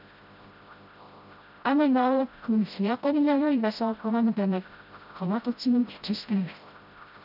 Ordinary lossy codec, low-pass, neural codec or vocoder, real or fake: none; 5.4 kHz; codec, 16 kHz, 0.5 kbps, FreqCodec, smaller model; fake